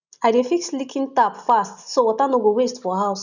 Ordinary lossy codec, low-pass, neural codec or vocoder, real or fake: none; 7.2 kHz; none; real